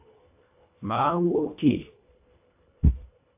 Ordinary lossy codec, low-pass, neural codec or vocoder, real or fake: AAC, 32 kbps; 3.6 kHz; codec, 24 kHz, 1.5 kbps, HILCodec; fake